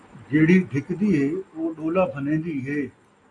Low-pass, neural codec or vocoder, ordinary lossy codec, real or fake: 10.8 kHz; none; AAC, 48 kbps; real